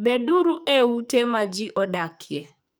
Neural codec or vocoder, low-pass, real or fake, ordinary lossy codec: codec, 44.1 kHz, 2.6 kbps, SNAC; none; fake; none